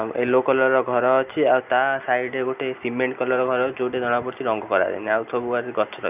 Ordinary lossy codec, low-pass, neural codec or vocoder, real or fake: none; 3.6 kHz; none; real